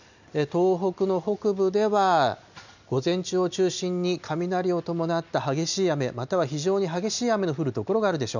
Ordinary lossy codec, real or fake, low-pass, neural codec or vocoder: none; real; 7.2 kHz; none